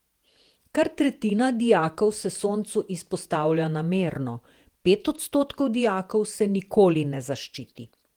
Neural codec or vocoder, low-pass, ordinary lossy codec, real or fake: vocoder, 48 kHz, 128 mel bands, Vocos; 19.8 kHz; Opus, 24 kbps; fake